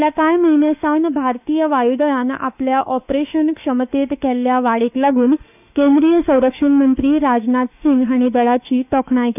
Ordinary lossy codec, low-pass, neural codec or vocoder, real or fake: none; 3.6 kHz; autoencoder, 48 kHz, 32 numbers a frame, DAC-VAE, trained on Japanese speech; fake